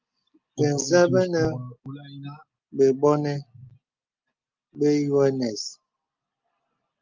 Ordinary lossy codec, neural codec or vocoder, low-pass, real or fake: Opus, 24 kbps; none; 7.2 kHz; real